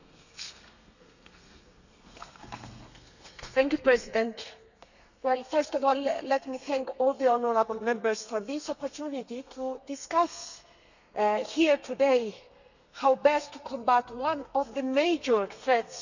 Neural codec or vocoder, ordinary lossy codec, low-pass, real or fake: codec, 32 kHz, 1.9 kbps, SNAC; none; 7.2 kHz; fake